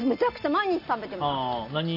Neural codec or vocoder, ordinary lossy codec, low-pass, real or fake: none; none; 5.4 kHz; real